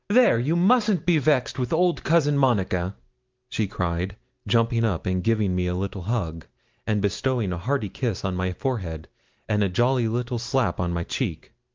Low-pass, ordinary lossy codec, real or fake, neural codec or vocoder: 7.2 kHz; Opus, 32 kbps; real; none